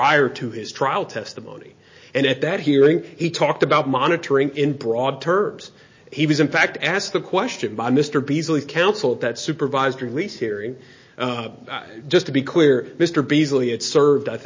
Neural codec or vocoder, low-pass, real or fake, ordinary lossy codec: none; 7.2 kHz; real; MP3, 32 kbps